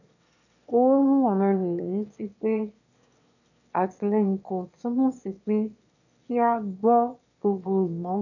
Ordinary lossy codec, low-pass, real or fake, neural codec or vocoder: none; 7.2 kHz; fake; autoencoder, 22.05 kHz, a latent of 192 numbers a frame, VITS, trained on one speaker